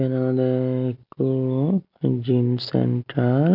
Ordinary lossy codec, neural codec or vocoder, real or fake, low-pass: none; none; real; 5.4 kHz